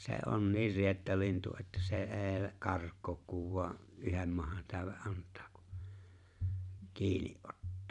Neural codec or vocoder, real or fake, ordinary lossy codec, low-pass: vocoder, 44.1 kHz, 128 mel bands every 512 samples, BigVGAN v2; fake; none; 10.8 kHz